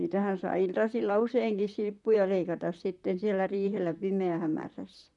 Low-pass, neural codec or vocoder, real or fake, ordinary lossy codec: 10.8 kHz; vocoder, 44.1 kHz, 128 mel bands, Pupu-Vocoder; fake; none